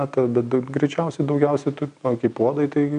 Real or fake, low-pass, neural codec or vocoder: real; 9.9 kHz; none